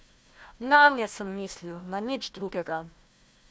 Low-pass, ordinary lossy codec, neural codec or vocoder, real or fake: none; none; codec, 16 kHz, 1 kbps, FunCodec, trained on Chinese and English, 50 frames a second; fake